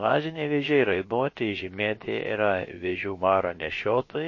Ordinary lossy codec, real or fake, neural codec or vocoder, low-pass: MP3, 32 kbps; fake; codec, 16 kHz, about 1 kbps, DyCAST, with the encoder's durations; 7.2 kHz